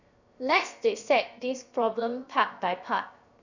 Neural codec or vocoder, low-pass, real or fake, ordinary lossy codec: codec, 16 kHz, 0.7 kbps, FocalCodec; 7.2 kHz; fake; none